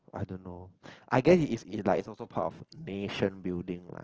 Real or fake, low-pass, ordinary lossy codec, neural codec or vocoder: real; 7.2 kHz; Opus, 16 kbps; none